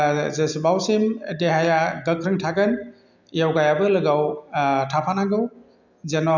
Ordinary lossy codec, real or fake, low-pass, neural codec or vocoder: none; real; 7.2 kHz; none